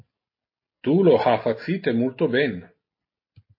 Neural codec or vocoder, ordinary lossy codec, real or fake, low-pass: none; MP3, 24 kbps; real; 5.4 kHz